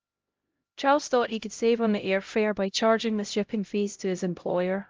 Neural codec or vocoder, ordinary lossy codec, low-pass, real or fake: codec, 16 kHz, 0.5 kbps, X-Codec, HuBERT features, trained on LibriSpeech; Opus, 24 kbps; 7.2 kHz; fake